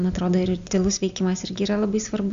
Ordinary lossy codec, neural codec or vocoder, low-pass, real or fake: AAC, 64 kbps; none; 7.2 kHz; real